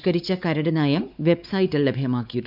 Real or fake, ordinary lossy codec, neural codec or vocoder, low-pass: fake; none; codec, 16 kHz, 4 kbps, X-Codec, WavLM features, trained on Multilingual LibriSpeech; 5.4 kHz